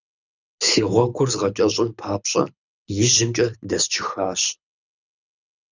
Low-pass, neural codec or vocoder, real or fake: 7.2 kHz; codec, 24 kHz, 6 kbps, HILCodec; fake